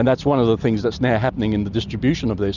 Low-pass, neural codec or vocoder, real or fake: 7.2 kHz; none; real